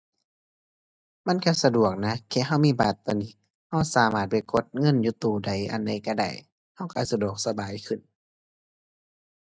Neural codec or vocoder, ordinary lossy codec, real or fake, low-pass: none; none; real; none